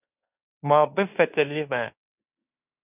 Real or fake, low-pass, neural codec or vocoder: fake; 3.6 kHz; codec, 16 kHz in and 24 kHz out, 0.9 kbps, LongCat-Audio-Codec, fine tuned four codebook decoder